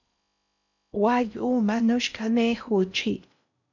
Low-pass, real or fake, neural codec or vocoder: 7.2 kHz; fake; codec, 16 kHz in and 24 kHz out, 0.6 kbps, FocalCodec, streaming, 4096 codes